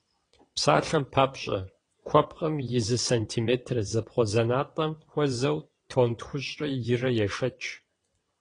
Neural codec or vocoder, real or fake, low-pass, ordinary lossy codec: vocoder, 22.05 kHz, 80 mel bands, WaveNeXt; fake; 9.9 kHz; AAC, 32 kbps